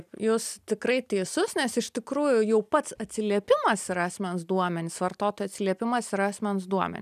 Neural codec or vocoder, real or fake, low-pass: none; real; 14.4 kHz